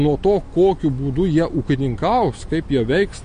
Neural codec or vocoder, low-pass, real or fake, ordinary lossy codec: none; 9.9 kHz; real; MP3, 48 kbps